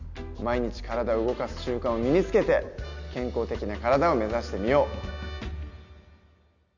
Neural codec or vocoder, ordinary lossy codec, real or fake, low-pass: none; none; real; 7.2 kHz